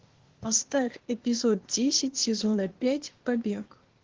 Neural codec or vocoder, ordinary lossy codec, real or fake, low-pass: codec, 16 kHz, 0.8 kbps, ZipCodec; Opus, 16 kbps; fake; 7.2 kHz